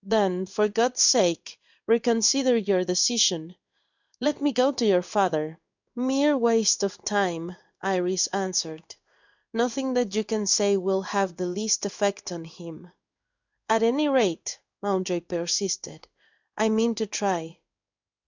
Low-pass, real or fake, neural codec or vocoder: 7.2 kHz; fake; codec, 16 kHz in and 24 kHz out, 1 kbps, XY-Tokenizer